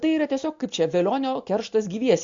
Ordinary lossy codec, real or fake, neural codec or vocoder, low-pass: MP3, 48 kbps; real; none; 7.2 kHz